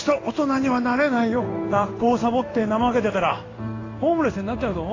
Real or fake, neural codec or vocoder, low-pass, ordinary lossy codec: fake; codec, 16 kHz in and 24 kHz out, 1 kbps, XY-Tokenizer; 7.2 kHz; AAC, 32 kbps